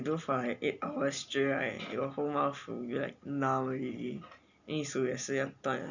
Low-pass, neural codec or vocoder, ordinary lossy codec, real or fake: 7.2 kHz; none; none; real